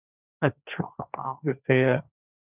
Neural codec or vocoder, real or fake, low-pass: codec, 16 kHz, 1.1 kbps, Voila-Tokenizer; fake; 3.6 kHz